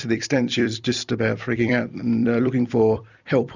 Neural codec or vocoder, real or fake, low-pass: vocoder, 44.1 kHz, 128 mel bands every 256 samples, BigVGAN v2; fake; 7.2 kHz